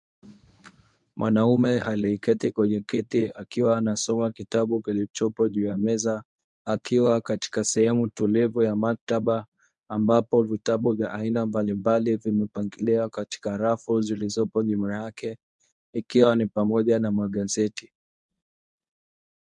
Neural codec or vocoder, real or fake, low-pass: codec, 24 kHz, 0.9 kbps, WavTokenizer, medium speech release version 1; fake; 10.8 kHz